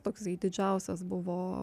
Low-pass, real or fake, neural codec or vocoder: 14.4 kHz; real; none